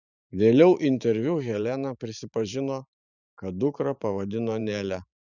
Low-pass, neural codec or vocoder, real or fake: 7.2 kHz; vocoder, 44.1 kHz, 128 mel bands every 512 samples, BigVGAN v2; fake